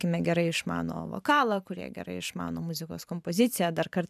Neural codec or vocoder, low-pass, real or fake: vocoder, 44.1 kHz, 128 mel bands every 512 samples, BigVGAN v2; 14.4 kHz; fake